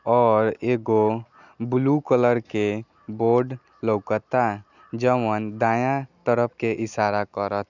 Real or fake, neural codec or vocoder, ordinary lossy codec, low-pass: real; none; none; 7.2 kHz